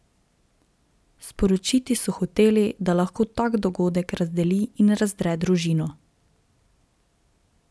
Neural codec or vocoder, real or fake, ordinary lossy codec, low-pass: none; real; none; none